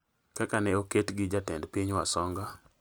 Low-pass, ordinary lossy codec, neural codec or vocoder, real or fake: none; none; none; real